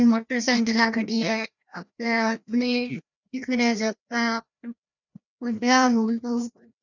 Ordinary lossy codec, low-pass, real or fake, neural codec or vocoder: none; 7.2 kHz; fake; codec, 16 kHz, 1 kbps, FreqCodec, larger model